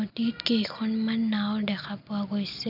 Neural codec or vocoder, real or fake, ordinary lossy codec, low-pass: none; real; none; 5.4 kHz